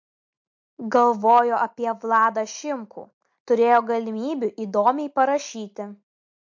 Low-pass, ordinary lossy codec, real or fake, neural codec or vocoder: 7.2 kHz; MP3, 48 kbps; real; none